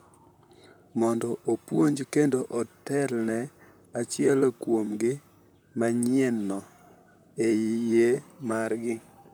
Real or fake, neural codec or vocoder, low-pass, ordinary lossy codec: fake; vocoder, 44.1 kHz, 128 mel bands, Pupu-Vocoder; none; none